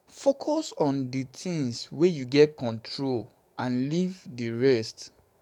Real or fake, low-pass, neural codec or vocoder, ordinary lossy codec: fake; 19.8 kHz; codec, 44.1 kHz, 7.8 kbps, DAC; none